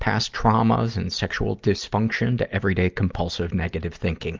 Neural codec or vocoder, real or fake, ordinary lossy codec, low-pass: none; real; Opus, 24 kbps; 7.2 kHz